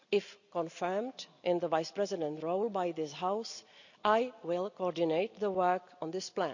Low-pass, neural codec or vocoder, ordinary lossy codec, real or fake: 7.2 kHz; none; none; real